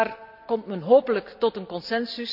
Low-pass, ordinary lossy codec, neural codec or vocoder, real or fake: 5.4 kHz; none; none; real